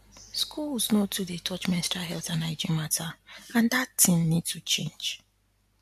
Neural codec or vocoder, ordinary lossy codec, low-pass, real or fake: none; AAC, 96 kbps; 14.4 kHz; real